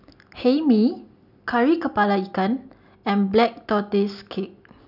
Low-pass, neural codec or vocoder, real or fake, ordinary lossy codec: 5.4 kHz; none; real; none